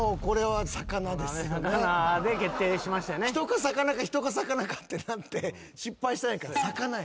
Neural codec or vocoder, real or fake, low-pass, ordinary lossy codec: none; real; none; none